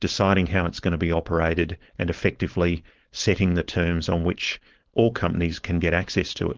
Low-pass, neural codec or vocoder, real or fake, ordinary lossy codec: 7.2 kHz; codec, 16 kHz, 4.8 kbps, FACodec; fake; Opus, 16 kbps